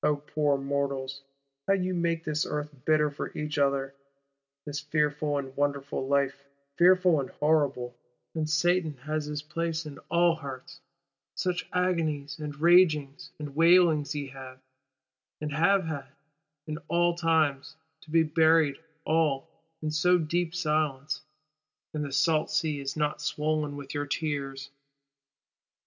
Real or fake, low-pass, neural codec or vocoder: real; 7.2 kHz; none